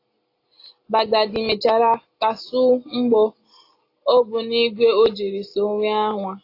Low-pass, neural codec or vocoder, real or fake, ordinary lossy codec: 5.4 kHz; none; real; AAC, 32 kbps